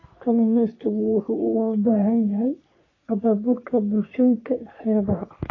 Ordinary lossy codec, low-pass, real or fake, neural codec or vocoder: AAC, 32 kbps; 7.2 kHz; fake; codec, 44.1 kHz, 3.4 kbps, Pupu-Codec